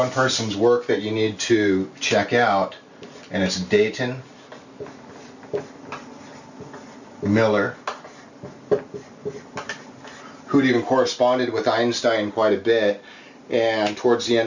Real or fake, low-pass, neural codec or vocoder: real; 7.2 kHz; none